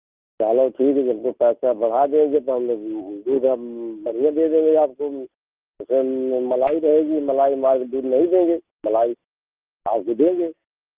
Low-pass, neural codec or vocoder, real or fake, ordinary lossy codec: 3.6 kHz; none; real; Opus, 24 kbps